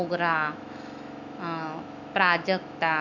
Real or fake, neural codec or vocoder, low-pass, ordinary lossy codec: fake; autoencoder, 48 kHz, 128 numbers a frame, DAC-VAE, trained on Japanese speech; 7.2 kHz; none